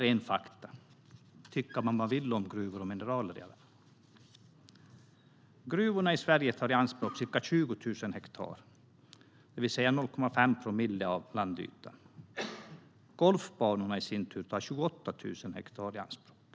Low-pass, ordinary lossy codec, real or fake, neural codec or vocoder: none; none; real; none